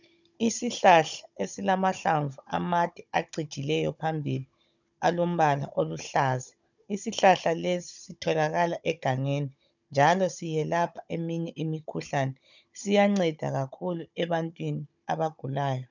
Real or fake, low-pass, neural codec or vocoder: fake; 7.2 kHz; codec, 16 kHz, 16 kbps, FunCodec, trained on Chinese and English, 50 frames a second